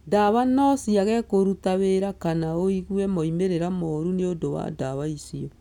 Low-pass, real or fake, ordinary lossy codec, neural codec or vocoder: 19.8 kHz; real; none; none